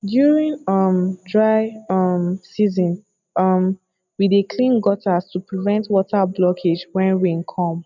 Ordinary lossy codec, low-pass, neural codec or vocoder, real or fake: none; 7.2 kHz; none; real